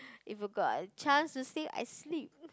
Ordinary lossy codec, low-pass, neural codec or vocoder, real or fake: none; none; none; real